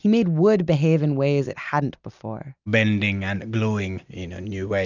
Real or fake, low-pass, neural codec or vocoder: real; 7.2 kHz; none